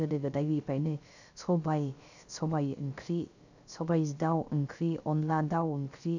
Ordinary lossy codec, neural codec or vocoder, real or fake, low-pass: none; codec, 16 kHz, 0.3 kbps, FocalCodec; fake; 7.2 kHz